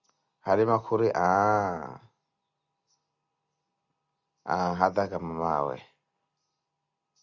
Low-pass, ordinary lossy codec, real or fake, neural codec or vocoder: 7.2 kHz; Opus, 64 kbps; real; none